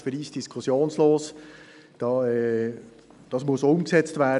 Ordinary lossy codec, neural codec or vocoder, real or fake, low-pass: none; none; real; 10.8 kHz